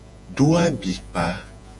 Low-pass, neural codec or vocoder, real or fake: 10.8 kHz; vocoder, 48 kHz, 128 mel bands, Vocos; fake